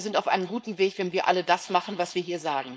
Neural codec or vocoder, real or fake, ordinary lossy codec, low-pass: codec, 16 kHz, 4.8 kbps, FACodec; fake; none; none